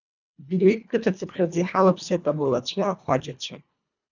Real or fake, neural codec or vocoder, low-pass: fake; codec, 24 kHz, 1.5 kbps, HILCodec; 7.2 kHz